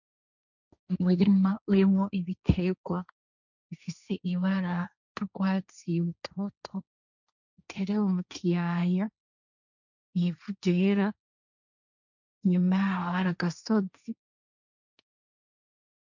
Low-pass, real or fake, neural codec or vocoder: 7.2 kHz; fake; codec, 16 kHz, 1.1 kbps, Voila-Tokenizer